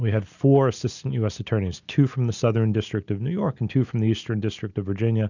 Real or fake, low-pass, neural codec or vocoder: real; 7.2 kHz; none